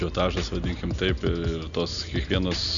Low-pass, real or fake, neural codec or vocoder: 7.2 kHz; real; none